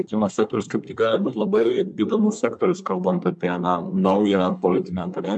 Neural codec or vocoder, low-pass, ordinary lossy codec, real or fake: codec, 24 kHz, 1 kbps, SNAC; 10.8 kHz; MP3, 64 kbps; fake